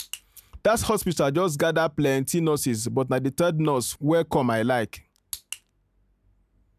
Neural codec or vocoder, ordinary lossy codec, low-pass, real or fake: none; none; 14.4 kHz; real